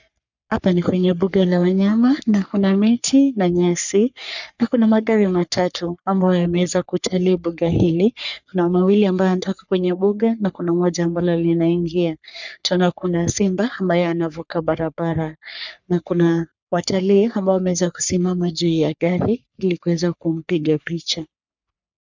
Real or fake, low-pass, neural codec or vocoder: fake; 7.2 kHz; codec, 44.1 kHz, 3.4 kbps, Pupu-Codec